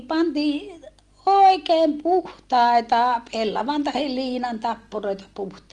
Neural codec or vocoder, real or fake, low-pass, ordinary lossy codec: none; real; 10.8 kHz; Opus, 24 kbps